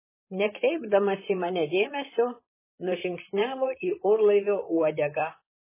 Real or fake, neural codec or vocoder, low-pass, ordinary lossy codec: fake; vocoder, 44.1 kHz, 128 mel bands, Pupu-Vocoder; 3.6 kHz; MP3, 16 kbps